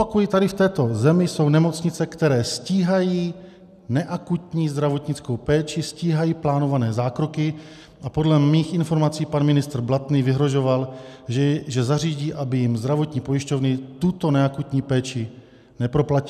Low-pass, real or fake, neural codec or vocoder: 14.4 kHz; real; none